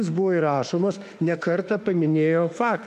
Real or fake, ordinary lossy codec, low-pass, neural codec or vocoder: fake; AAC, 96 kbps; 14.4 kHz; autoencoder, 48 kHz, 32 numbers a frame, DAC-VAE, trained on Japanese speech